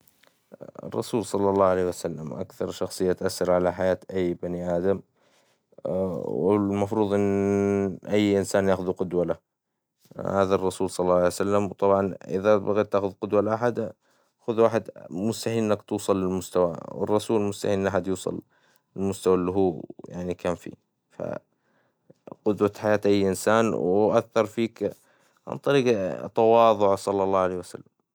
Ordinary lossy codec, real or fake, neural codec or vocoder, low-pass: none; real; none; none